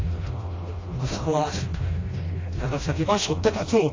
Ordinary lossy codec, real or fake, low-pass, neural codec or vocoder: AAC, 32 kbps; fake; 7.2 kHz; codec, 16 kHz, 1 kbps, FreqCodec, smaller model